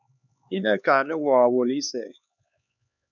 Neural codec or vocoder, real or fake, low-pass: codec, 16 kHz, 4 kbps, X-Codec, HuBERT features, trained on LibriSpeech; fake; 7.2 kHz